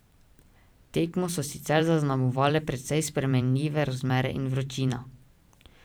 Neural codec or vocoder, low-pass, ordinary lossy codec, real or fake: vocoder, 44.1 kHz, 128 mel bands every 512 samples, BigVGAN v2; none; none; fake